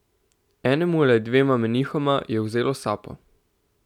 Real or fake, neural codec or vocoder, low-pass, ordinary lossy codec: real; none; 19.8 kHz; none